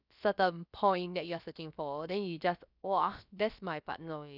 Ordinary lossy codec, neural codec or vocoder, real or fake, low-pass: none; codec, 16 kHz, about 1 kbps, DyCAST, with the encoder's durations; fake; 5.4 kHz